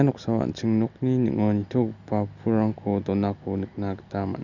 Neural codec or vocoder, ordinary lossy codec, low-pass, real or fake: none; none; 7.2 kHz; real